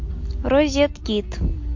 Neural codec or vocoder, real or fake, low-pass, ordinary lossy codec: none; real; 7.2 kHz; MP3, 48 kbps